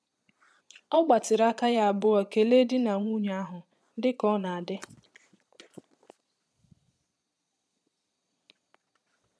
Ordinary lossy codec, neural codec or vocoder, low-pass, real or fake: none; vocoder, 22.05 kHz, 80 mel bands, Vocos; none; fake